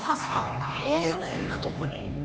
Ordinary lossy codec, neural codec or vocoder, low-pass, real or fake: none; codec, 16 kHz, 2 kbps, X-Codec, HuBERT features, trained on LibriSpeech; none; fake